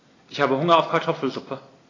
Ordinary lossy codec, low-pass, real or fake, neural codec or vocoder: AAC, 32 kbps; 7.2 kHz; real; none